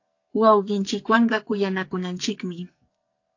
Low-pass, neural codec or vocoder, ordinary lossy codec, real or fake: 7.2 kHz; codec, 44.1 kHz, 2.6 kbps, SNAC; AAC, 48 kbps; fake